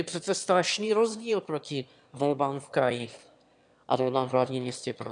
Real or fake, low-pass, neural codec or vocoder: fake; 9.9 kHz; autoencoder, 22.05 kHz, a latent of 192 numbers a frame, VITS, trained on one speaker